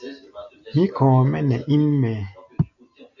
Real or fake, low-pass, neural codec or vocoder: real; 7.2 kHz; none